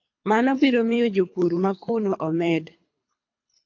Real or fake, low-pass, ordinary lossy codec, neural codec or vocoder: fake; 7.2 kHz; AAC, 48 kbps; codec, 24 kHz, 3 kbps, HILCodec